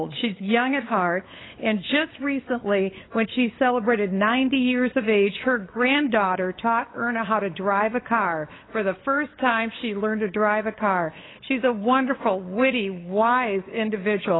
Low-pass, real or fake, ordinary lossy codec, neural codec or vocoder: 7.2 kHz; fake; AAC, 16 kbps; codec, 16 kHz, 8 kbps, FunCodec, trained on LibriTTS, 25 frames a second